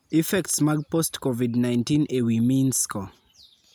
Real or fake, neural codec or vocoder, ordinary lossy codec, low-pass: real; none; none; none